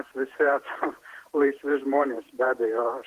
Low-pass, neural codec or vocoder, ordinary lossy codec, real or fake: 14.4 kHz; vocoder, 48 kHz, 128 mel bands, Vocos; Opus, 16 kbps; fake